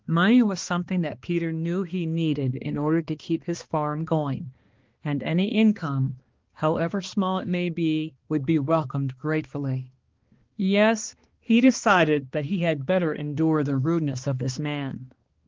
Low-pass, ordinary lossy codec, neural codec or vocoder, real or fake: 7.2 kHz; Opus, 16 kbps; codec, 16 kHz, 2 kbps, X-Codec, HuBERT features, trained on balanced general audio; fake